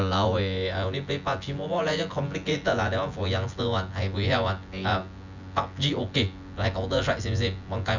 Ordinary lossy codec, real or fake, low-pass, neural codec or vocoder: none; fake; 7.2 kHz; vocoder, 24 kHz, 100 mel bands, Vocos